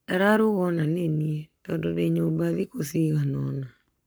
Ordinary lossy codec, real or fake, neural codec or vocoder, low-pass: none; fake; codec, 44.1 kHz, 7.8 kbps, Pupu-Codec; none